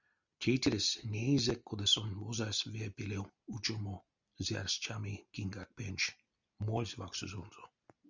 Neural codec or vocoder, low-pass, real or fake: none; 7.2 kHz; real